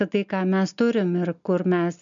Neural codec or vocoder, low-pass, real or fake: none; 7.2 kHz; real